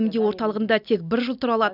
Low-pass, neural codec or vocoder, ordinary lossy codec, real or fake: 5.4 kHz; none; none; real